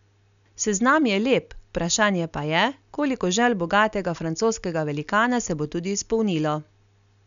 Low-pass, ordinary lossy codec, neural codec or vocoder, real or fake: 7.2 kHz; none; none; real